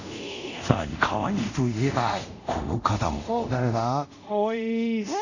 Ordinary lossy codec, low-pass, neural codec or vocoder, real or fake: none; 7.2 kHz; codec, 24 kHz, 0.5 kbps, DualCodec; fake